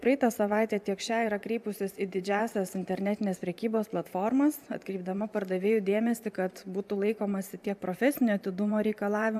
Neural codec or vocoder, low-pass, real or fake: vocoder, 44.1 kHz, 128 mel bands every 512 samples, BigVGAN v2; 14.4 kHz; fake